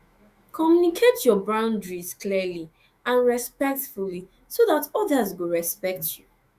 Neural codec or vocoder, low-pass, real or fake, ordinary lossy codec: autoencoder, 48 kHz, 128 numbers a frame, DAC-VAE, trained on Japanese speech; 14.4 kHz; fake; Opus, 64 kbps